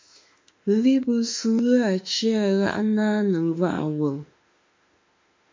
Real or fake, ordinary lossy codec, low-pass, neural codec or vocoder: fake; MP3, 48 kbps; 7.2 kHz; autoencoder, 48 kHz, 32 numbers a frame, DAC-VAE, trained on Japanese speech